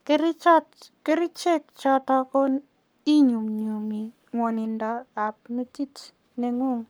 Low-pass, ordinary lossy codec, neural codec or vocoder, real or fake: none; none; codec, 44.1 kHz, 7.8 kbps, Pupu-Codec; fake